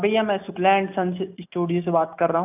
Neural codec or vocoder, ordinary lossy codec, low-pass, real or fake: none; none; 3.6 kHz; real